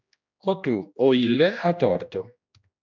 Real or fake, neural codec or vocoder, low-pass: fake; codec, 16 kHz, 1 kbps, X-Codec, HuBERT features, trained on general audio; 7.2 kHz